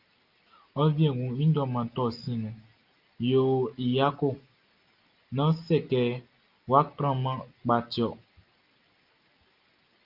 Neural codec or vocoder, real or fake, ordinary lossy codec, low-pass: none; real; Opus, 32 kbps; 5.4 kHz